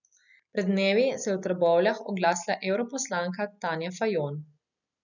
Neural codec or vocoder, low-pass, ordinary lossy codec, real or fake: none; 7.2 kHz; none; real